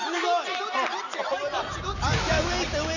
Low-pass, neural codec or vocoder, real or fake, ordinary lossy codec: 7.2 kHz; none; real; none